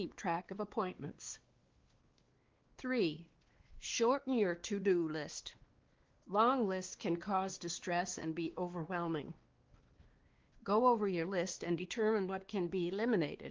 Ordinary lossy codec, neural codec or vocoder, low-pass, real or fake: Opus, 16 kbps; codec, 16 kHz, 2 kbps, X-Codec, WavLM features, trained on Multilingual LibriSpeech; 7.2 kHz; fake